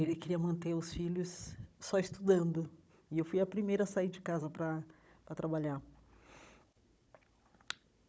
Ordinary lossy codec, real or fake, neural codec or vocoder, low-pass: none; fake; codec, 16 kHz, 16 kbps, FunCodec, trained on Chinese and English, 50 frames a second; none